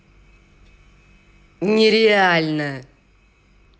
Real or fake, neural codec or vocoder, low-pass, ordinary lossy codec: real; none; none; none